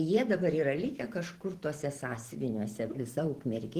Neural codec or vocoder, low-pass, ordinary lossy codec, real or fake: vocoder, 44.1 kHz, 128 mel bands every 512 samples, BigVGAN v2; 14.4 kHz; Opus, 16 kbps; fake